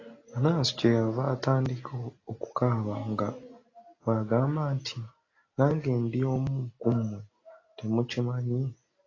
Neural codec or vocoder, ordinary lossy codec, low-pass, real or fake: none; AAC, 32 kbps; 7.2 kHz; real